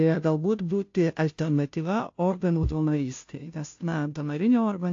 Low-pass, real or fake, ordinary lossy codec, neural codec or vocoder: 7.2 kHz; fake; AAC, 48 kbps; codec, 16 kHz, 0.5 kbps, FunCodec, trained on Chinese and English, 25 frames a second